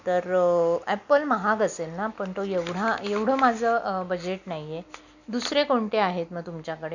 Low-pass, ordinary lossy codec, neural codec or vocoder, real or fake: 7.2 kHz; none; none; real